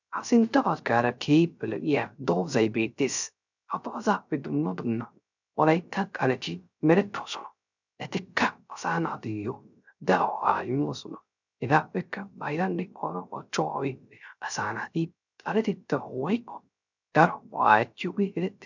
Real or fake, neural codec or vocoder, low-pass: fake; codec, 16 kHz, 0.3 kbps, FocalCodec; 7.2 kHz